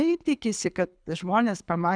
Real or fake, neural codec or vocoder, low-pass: fake; codec, 24 kHz, 6 kbps, HILCodec; 9.9 kHz